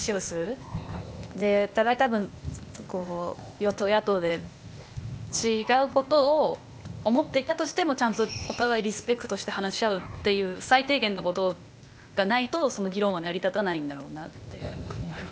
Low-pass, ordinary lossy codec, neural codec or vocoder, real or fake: none; none; codec, 16 kHz, 0.8 kbps, ZipCodec; fake